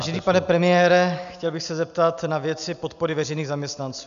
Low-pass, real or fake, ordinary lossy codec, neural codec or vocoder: 7.2 kHz; real; MP3, 96 kbps; none